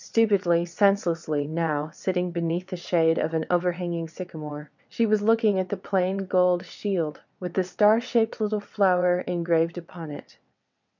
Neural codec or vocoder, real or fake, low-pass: vocoder, 22.05 kHz, 80 mel bands, WaveNeXt; fake; 7.2 kHz